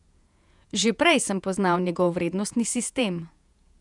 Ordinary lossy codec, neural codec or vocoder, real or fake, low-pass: none; vocoder, 48 kHz, 128 mel bands, Vocos; fake; 10.8 kHz